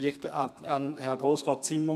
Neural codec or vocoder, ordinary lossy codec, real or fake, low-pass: codec, 32 kHz, 1.9 kbps, SNAC; none; fake; 14.4 kHz